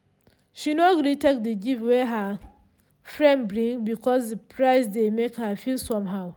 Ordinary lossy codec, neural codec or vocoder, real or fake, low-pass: none; none; real; none